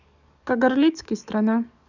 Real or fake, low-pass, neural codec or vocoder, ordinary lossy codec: fake; 7.2 kHz; codec, 44.1 kHz, 7.8 kbps, DAC; none